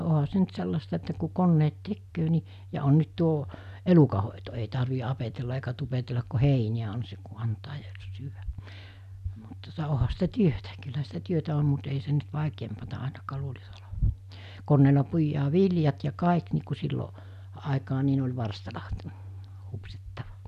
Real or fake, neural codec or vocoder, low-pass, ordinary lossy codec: real; none; 14.4 kHz; none